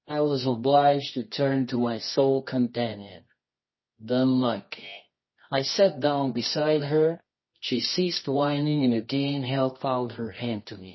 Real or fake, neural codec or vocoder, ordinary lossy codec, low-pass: fake; codec, 24 kHz, 0.9 kbps, WavTokenizer, medium music audio release; MP3, 24 kbps; 7.2 kHz